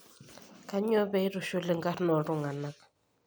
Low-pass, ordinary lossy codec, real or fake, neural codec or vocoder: none; none; real; none